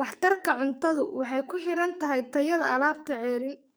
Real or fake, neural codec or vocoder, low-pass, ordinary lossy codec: fake; codec, 44.1 kHz, 2.6 kbps, SNAC; none; none